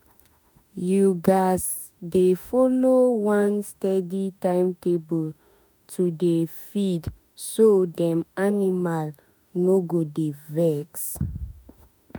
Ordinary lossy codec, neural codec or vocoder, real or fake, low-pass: none; autoencoder, 48 kHz, 32 numbers a frame, DAC-VAE, trained on Japanese speech; fake; none